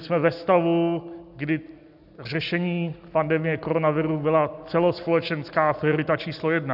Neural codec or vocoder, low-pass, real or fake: none; 5.4 kHz; real